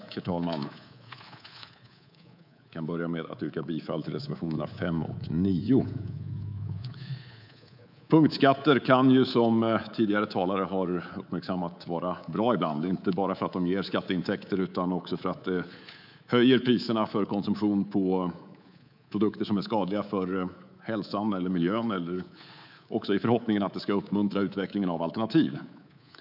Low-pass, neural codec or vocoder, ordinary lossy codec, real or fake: 5.4 kHz; codec, 24 kHz, 3.1 kbps, DualCodec; none; fake